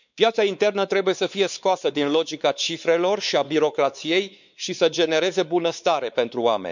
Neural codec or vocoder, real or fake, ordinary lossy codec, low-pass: codec, 16 kHz, 4 kbps, X-Codec, WavLM features, trained on Multilingual LibriSpeech; fake; none; 7.2 kHz